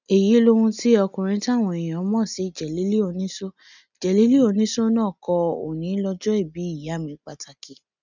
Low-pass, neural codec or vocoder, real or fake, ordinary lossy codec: 7.2 kHz; none; real; none